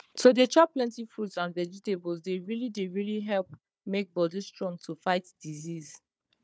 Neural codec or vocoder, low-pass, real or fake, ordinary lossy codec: codec, 16 kHz, 4 kbps, FunCodec, trained on Chinese and English, 50 frames a second; none; fake; none